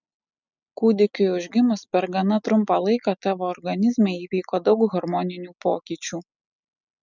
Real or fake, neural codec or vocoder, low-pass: real; none; 7.2 kHz